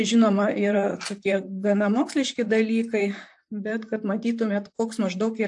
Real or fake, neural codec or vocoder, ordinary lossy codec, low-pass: fake; vocoder, 44.1 kHz, 128 mel bands, Pupu-Vocoder; AAC, 64 kbps; 10.8 kHz